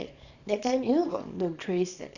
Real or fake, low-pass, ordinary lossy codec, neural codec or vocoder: fake; 7.2 kHz; none; codec, 24 kHz, 0.9 kbps, WavTokenizer, small release